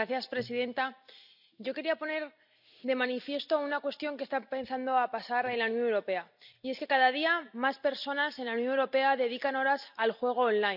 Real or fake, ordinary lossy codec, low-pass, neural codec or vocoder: real; none; 5.4 kHz; none